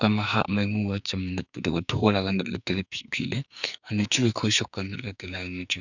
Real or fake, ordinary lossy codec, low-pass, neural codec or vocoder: fake; none; 7.2 kHz; codec, 44.1 kHz, 2.6 kbps, SNAC